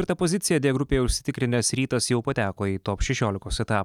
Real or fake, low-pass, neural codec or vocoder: real; 19.8 kHz; none